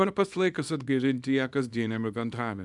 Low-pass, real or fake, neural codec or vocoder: 10.8 kHz; fake; codec, 24 kHz, 0.9 kbps, WavTokenizer, small release